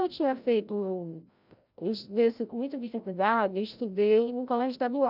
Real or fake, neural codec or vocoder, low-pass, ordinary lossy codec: fake; codec, 16 kHz, 0.5 kbps, FreqCodec, larger model; 5.4 kHz; none